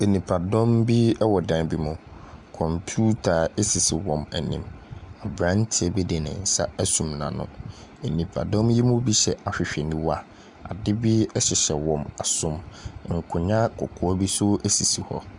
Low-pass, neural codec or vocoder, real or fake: 10.8 kHz; none; real